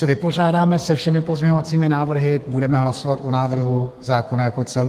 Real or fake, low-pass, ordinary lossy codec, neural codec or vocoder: fake; 14.4 kHz; Opus, 32 kbps; codec, 32 kHz, 1.9 kbps, SNAC